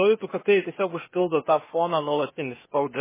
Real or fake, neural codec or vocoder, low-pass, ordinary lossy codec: fake; codec, 16 kHz, 0.8 kbps, ZipCodec; 3.6 kHz; MP3, 16 kbps